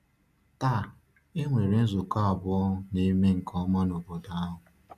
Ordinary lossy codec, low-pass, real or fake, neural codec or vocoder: none; 14.4 kHz; real; none